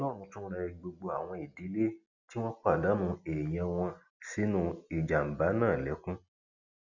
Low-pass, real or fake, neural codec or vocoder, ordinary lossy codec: 7.2 kHz; real; none; none